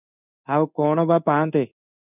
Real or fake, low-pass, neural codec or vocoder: fake; 3.6 kHz; codec, 16 kHz in and 24 kHz out, 1 kbps, XY-Tokenizer